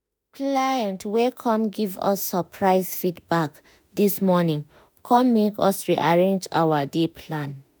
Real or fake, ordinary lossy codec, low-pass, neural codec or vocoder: fake; none; none; autoencoder, 48 kHz, 32 numbers a frame, DAC-VAE, trained on Japanese speech